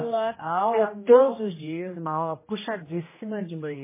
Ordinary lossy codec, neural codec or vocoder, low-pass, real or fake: MP3, 16 kbps; codec, 16 kHz, 1 kbps, X-Codec, HuBERT features, trained on general audio; 3.6 kHz; fake